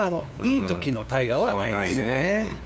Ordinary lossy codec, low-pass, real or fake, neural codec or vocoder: none; none; fake; codec, 16 kHz, 2 kbps, FunCodec, trained on LibriTTS, 25 frames a second